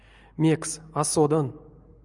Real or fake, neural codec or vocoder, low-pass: real; none; 10.8 kHz